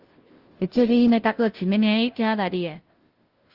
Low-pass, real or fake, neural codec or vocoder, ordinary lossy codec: 5.4 kHz; fake; codec, 16 kHz, 0.5 kbps, FunCodec, trained on Chinese and English, 25 frames a second; Opus, 16 kbps